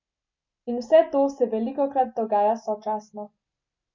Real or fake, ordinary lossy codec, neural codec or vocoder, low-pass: real; MP3, 64 kbps; none; 7.2 kHz